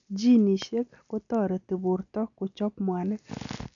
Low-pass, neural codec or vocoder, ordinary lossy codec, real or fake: 7.2 kHz; none; none; real